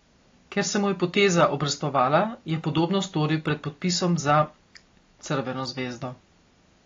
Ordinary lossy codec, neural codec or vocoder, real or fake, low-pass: AAC, 32 kbps; none; real; 7.2 kHz